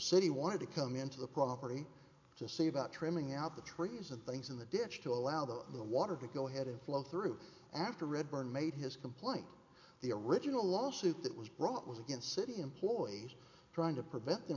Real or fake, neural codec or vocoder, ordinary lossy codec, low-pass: real; none; AAC, 48 kbps; 7.2 kHz